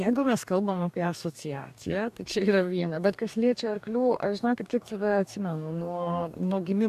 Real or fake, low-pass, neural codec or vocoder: fake; 14.4 kHz; codec, 44.1 kHz, 2.6 kbps, DAC